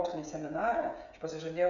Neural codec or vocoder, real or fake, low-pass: codec, 16 kHz, 16 kbps, FreqCodec, smaller model; fake; 7.2 kHz